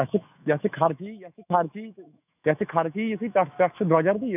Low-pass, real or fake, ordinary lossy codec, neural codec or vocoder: 3.6 kHz; fake; none; autoencoder, 48 kHz, 128 numbers a frame, DAC-VAE, trained on Japanese speech